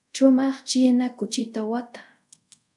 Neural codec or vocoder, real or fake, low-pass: codec, 24 kHz, 0.5 kbps, DualCodec; fake; 10.8 kHz